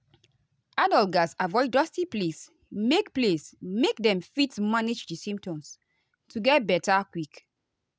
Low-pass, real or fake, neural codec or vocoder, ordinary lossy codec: none; real; none; none